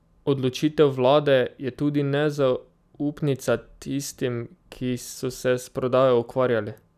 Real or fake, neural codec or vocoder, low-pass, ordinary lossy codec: real; none; 14.4 kHz; none